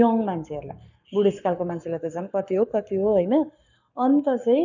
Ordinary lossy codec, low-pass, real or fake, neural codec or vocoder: none; 7.2 kHz; fake; codec, 44.1 kHz, 7.8 kbps, Pupu-Codec